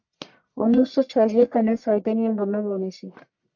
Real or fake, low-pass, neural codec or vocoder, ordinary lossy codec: fake; 7.2 kHz; codec, 44.1 kHz, 1.7 kbps, Pupu-Codec; MP3, 64 kbps